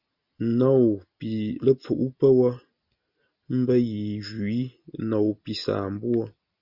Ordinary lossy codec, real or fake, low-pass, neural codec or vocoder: Opus, 64 kbps; real; 5.4 kHz; none